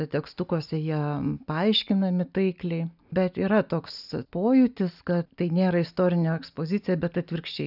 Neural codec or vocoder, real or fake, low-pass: none; real; 5.4 kHz